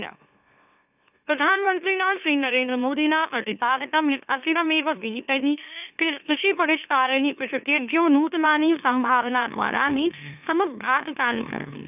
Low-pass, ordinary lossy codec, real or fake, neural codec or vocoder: 3.6 kHz; none; fake; autoencoder, 44.1 kHz, a latent of 192 numbers a frame, MeloTTS